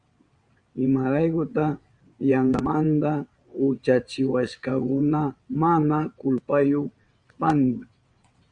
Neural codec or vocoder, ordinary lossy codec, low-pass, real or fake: vocoder, 22.05 kHz, 80 mel bands, WaveNeXt; Opus, 64 kbps; 9.9 kHz; fake